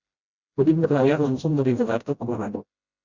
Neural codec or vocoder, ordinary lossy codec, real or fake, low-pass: codec, 16 kHz, 0.5 kbps, FreqCodec, smaller model; Opus, 64 kbps; fake; 7.2 kHz